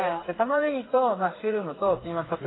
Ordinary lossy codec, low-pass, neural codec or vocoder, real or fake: AAC, 16 kbps; 7.2 kHz; codec, 16 kHz, 4 kbps, FreqCodec, smaller model; fake